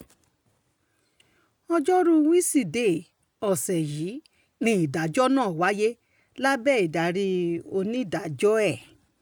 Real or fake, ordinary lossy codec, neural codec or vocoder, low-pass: real; none; none; none